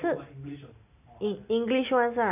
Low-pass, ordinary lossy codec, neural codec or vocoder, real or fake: 3.6 kHz; none; none; real